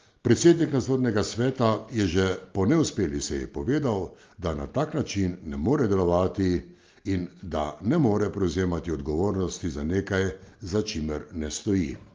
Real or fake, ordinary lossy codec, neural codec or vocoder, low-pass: real; Opus, 32 kbps; none; 7.2 kHz